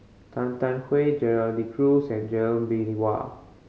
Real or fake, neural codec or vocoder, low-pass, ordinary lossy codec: real; none; none; none